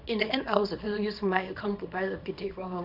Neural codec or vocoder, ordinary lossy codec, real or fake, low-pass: codec, 24 kHz, 0.9 kbps, WavTokenizer, small release; none; fake; 5.4 kHz